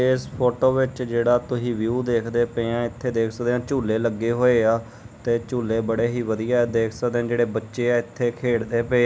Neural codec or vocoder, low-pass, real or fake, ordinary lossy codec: none; none; real; none